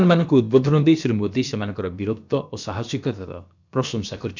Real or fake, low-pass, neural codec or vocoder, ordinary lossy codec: fake; 7.2 kHz; codec, 16 kHz, about 1 kbps, DyCAST, with the encoder's durations; none